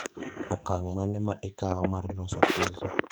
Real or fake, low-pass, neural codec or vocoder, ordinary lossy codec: fake; none; codec, 44.1 kHz, 2.6 kbps, SNAC; none